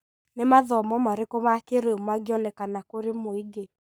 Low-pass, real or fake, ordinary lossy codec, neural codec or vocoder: none; fake; none; codec, 44.1 kHz, 7.8 kbps, Pupu-Codec